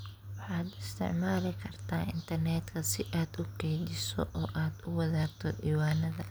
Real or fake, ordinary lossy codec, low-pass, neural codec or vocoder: real; none; none; none